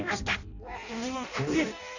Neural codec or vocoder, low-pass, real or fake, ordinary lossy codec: codec, 16 kHz in and 24 kHz out, 0.6 kbps, FireRedTTS-2 codec; 7.2 kHz; fake; none